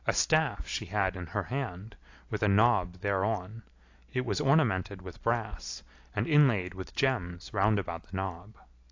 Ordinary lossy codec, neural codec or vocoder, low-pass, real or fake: AAC, 48 kbps; none; 7.2 kHz; real